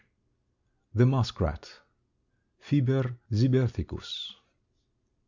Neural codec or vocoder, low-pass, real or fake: none; 7.2 kHz; real